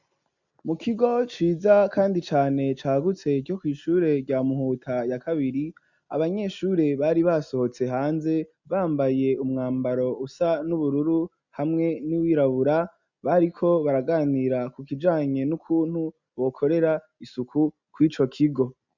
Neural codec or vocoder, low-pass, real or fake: none; 7.2 kHz; real